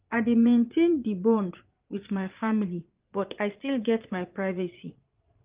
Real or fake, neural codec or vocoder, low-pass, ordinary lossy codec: fake; vocoder, 22.05 kHz, 80 mel bands, Vocos; 3.6 kHz; Opus, 32 kbps